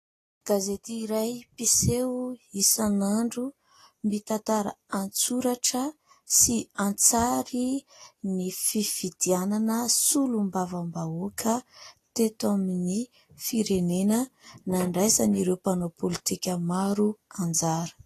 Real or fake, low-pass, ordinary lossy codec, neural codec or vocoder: real; 14.4 kHz; AAC, 48 kbps; none